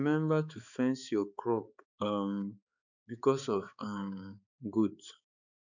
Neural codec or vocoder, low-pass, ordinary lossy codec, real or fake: codec, 16 kHz, 4 kbps, X-Codec, HuBERT features, trained on balanced general audio; 7.2 kHz; none; fake